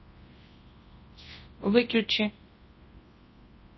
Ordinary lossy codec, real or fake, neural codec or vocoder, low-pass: MP3, 24 kbps; fake; codec, 24 kHz, 0.9 kbps, WavTokenizer, large speech release; 7.2 kHz